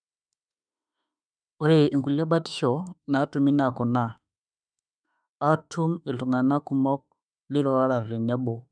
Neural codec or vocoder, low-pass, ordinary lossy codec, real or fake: autoencoder, 48 kHz, 32 numbers a frame, DAC-VAE, trained on Japanese speech; 9.9 kHz; none; fake